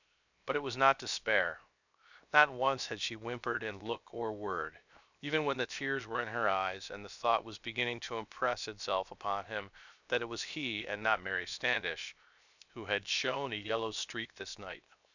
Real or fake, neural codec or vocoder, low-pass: fake; codec, 16 kHz, 0.7 kbps, FocalCodec; 7.2 kHz